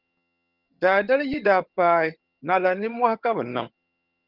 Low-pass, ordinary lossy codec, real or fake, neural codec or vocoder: 5.4 kHz; Opus, 24 kbps; fake; vocoder, 22.05 kHz, 80 mel bands, HiFi-GAN